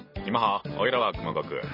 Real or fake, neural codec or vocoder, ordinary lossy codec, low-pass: real; none; MP3, 24 kbps; 5.4 kHz